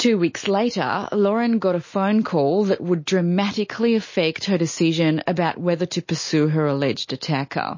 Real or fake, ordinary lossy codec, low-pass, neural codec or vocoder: real; MP3, 32 kbps; 7.2 kHz; none